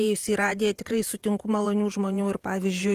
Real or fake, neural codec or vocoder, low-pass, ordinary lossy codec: fake; vocoder, 48 kHz, 128 mel bands, Vocos; 14.4 kHz; Opus, 24 kbps